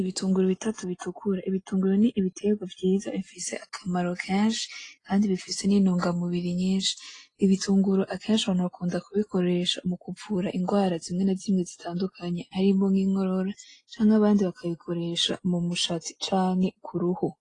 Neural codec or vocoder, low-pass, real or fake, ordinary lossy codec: none; 10.8 kHz; real; AAC, 32 kbps